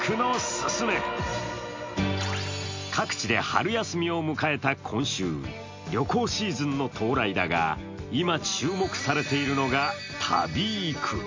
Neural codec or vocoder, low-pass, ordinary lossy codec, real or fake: none; 7.2 kHz; MP3, 48 kbps; real